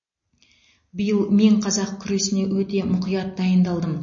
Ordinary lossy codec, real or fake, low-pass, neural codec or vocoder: MP3, 32 kbps; real; 7.2 kHz; none